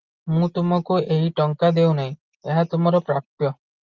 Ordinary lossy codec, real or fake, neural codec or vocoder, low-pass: Opus, 32 kbps; real; none; 7.2 kHz